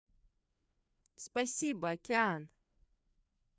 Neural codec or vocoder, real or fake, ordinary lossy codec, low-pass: codec, 16 kHz, 2 kbps, FreqCodec, larger model; fake; none; none